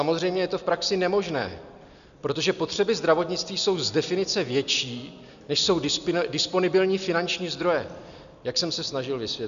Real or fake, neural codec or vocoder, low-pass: real; none; 7.2 kHz